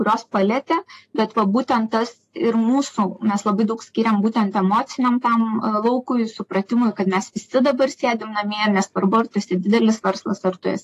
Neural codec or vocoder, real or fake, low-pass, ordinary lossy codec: none; real; 14.4 kHz; AAC, 48 kbps